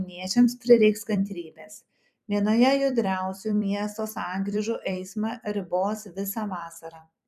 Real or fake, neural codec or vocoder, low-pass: fake; vocoder, 44.1 kHz, 128 mel bands every 256 samples, BigVGAN v2; 14.4 kHz